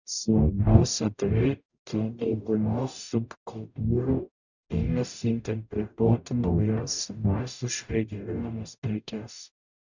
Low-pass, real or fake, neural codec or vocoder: 7.2 kHz; fake; codec, 44.1 kHz, 0.9 kbps, DAC